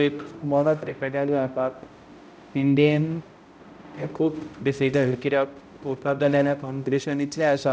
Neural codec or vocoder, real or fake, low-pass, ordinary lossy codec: codec, 16 kHz, 0.5 kbps, X-Codec, HuBERT features, trained on balanced general audio; fake; none; none